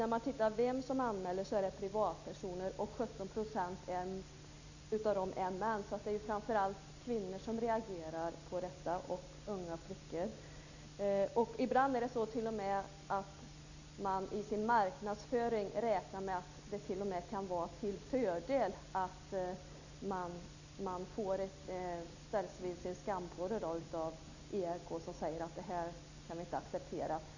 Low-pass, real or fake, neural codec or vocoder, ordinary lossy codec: 7.2 kHz; real; none; none